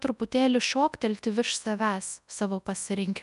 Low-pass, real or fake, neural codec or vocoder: 10.8 kHz; fake; codec, 24 kHz, 0.9 kbps, WavTokenizer, large speech release